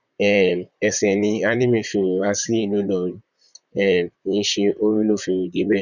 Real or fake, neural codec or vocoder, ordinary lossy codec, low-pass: fake; vocoder, 44.1 kHz, 128 mel bands, Pupu-Vocoder; none; 7.2 kHz